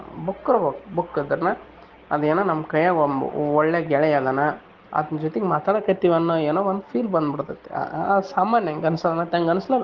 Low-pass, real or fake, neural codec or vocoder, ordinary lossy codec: 7.2 kHz; real; none; Opus, 16 kbps